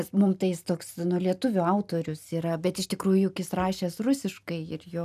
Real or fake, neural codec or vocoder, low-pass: real; none; 14.4 kHz